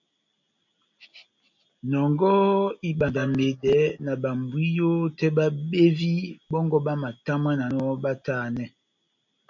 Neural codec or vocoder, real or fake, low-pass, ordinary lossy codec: none; real; 7.2 kHz; MP3, 64 kbps